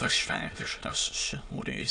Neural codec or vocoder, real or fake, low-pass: autoencoder, 22.05 kHz, a latent of 192 numbers a frame, VITS, trained on many speakers; fake; 9.9 kHz